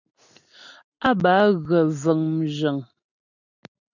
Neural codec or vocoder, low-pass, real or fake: none; 7.2 kHz; real